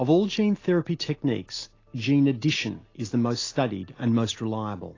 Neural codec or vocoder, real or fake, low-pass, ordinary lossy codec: none; real; 7.2 kHz; AAC, 32 kbps